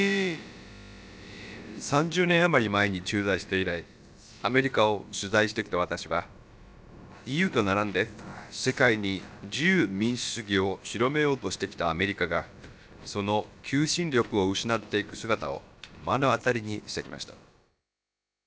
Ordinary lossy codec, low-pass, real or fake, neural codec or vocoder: none; none; fake; codec, 16 kHz, about 1 kbps, DyCAST, with the encoder's durations